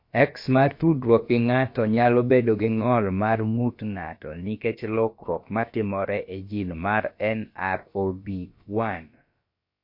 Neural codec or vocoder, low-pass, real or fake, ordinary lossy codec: codec, 16 kHz, about 1 kbps, DyCAST, with the encoder's durations; 5.4 kHz; fake; MP3, 32 kbps